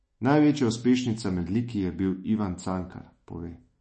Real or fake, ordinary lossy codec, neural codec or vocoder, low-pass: fake; MP3, 32 kbps; autoencoder, 48 kHz, 128 numbers a frame, DAC-VAE, trained on Japanese speech; 10.8 kHz